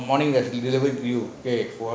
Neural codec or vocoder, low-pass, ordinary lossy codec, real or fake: none; none; none; real